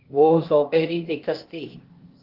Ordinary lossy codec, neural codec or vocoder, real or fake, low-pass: Opus, 16 kbps; codec, 16 kHz, 0.8 kbps, ZipCodec; fake; 5.4 kHz